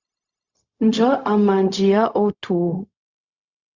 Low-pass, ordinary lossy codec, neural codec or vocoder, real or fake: 7.2 kHz; Opus, 64 kbps; codec, 16 kHz, 0.4 kbps, LongCat-Audio-Codec; fake